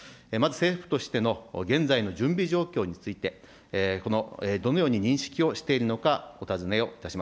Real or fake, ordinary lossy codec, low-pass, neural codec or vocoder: real; none; none; none